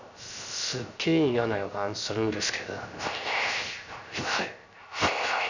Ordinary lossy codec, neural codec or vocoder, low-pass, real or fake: none; codec, 16 kHz, 0.3 kbps, FocalCodec; 7.2 kHz; fake